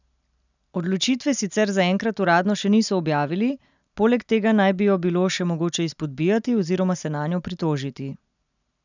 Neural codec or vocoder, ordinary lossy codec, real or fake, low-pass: none; none; real; 7.2 kHz